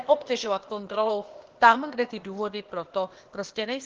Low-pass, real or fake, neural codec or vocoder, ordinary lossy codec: 7.2 kHz; fake; codec, 16 kHz, 0.8 kbps, ZipCodec; Opus, 16 kbps